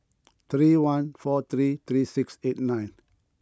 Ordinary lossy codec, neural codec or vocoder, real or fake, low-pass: none; none; real; none